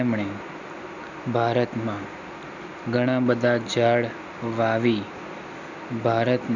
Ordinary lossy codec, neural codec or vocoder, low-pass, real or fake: none; none; 7.2 kHz; real